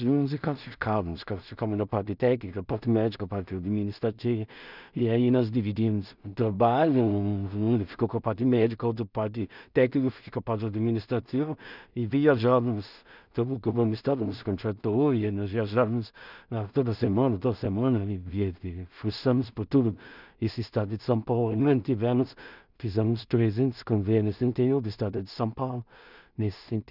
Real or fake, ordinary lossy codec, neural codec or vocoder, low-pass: fake; none; codec, 16 kHz in and 24 kHz out, 0.4 kbps, LongCat-Audio-Codec, two codebook decoder; 5.4 kHz